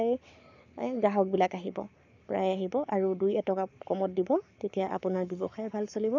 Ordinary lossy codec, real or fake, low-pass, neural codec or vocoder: none; fake; 7.2 kHz; codec, 44.1 kHz, 7.8 kbps, Pupu-Codec